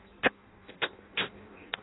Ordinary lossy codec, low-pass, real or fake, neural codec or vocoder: AAC, 16 kbps; 7.2 kHz; fake; codec, 16 kHz in and 24 kHz out, 0.6 kbps, FireRedTTS-2 codec